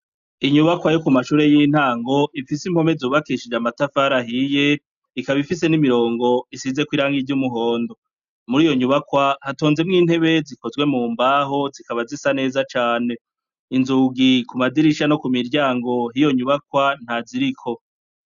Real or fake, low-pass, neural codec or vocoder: real; 7.2 kHz; none